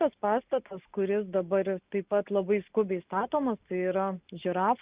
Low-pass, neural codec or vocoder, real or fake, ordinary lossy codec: 3.6 kHz; none; real; Opus, 64 kbps